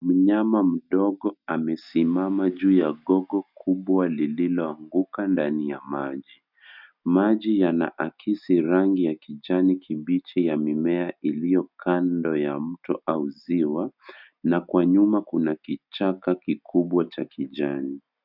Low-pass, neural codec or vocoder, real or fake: 5.4 kHz; none; real